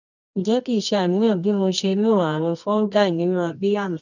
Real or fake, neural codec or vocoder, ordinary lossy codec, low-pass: fake; codec, 24 kHz, 0.9 kbps, WavTokenizer, medium music audio release; none; 7.2 kHz